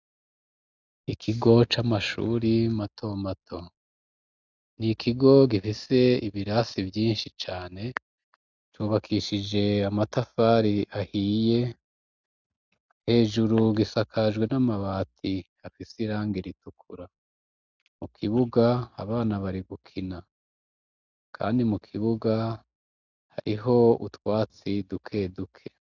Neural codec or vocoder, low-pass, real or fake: none; 7.2 kHz; real